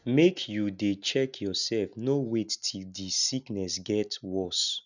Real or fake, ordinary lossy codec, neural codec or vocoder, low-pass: real; none; none; 7.2 kHz